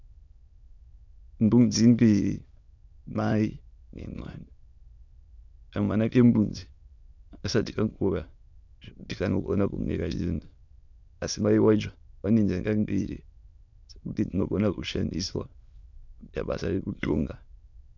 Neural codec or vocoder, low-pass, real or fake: autoencoder, 22.05 kHz, a latent of 192 numbers a frame, VITS, trained on many speakers; 7.2 kHz; fake